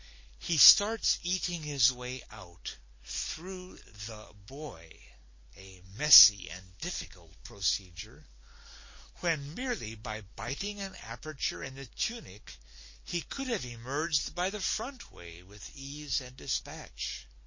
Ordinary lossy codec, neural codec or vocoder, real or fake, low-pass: MP3, 32 kbps; none; real; 7.2 kHz